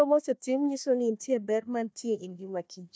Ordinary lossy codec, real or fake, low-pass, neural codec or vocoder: none; fake; none; codec, 16 kHz, 1 kbps, FunCodec, trained on Chinese and English, 50 frames a second